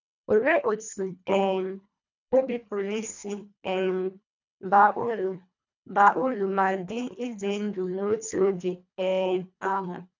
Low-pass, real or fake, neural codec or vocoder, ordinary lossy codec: 7.2 kHz; fake; codec, 24 kHz, 1.5 kbps, HILCodec; none